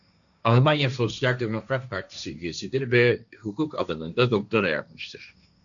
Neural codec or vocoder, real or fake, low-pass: codec, 16 kHz, 1.1 kbps, Voila-Tokenizer; fake; 7.2 kHz